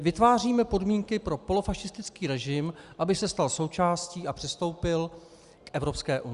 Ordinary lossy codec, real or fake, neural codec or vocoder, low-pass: Opus, 64 kbps; real; none; 10.8 kHz